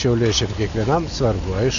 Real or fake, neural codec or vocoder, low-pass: real; none; 7.2 kHz